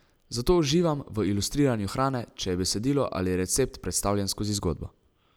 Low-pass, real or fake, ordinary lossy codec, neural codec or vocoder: none; real; none; none